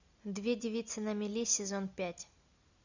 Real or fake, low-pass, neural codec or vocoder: real; 7.2 kHz; none